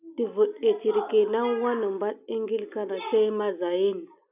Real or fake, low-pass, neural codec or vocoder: real; 3.6 kHz; none